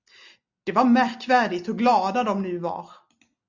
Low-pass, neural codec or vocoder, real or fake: 7.2 kHz; none; real